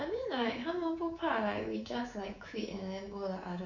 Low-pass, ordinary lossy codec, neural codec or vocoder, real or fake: 7.2 kHz; none; codec, 24 kHz, 3.1 kbps, DualCodec; fake